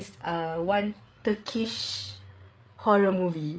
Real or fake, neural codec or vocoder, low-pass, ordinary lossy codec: fake; codec, 16 kHz, 8 kbps, FreqCodec, larger model; none; none